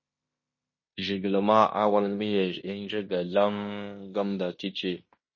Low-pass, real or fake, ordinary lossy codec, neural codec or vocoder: 7.2 kHz; fake; MP3, 32 kbps; codec, 16 kHz in and 24 kHz out, 0.9 kbps, LongCat-Audio-Codec, fine tuned four codebook decoder